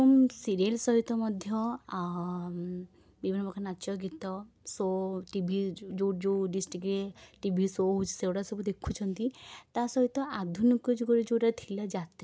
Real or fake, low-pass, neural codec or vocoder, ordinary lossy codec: real; none; none; none